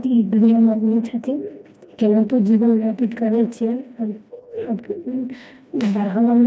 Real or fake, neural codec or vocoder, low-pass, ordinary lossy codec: fake; codec, 16 kHz, 1 kbps, FreqCodec, smaller model; none; none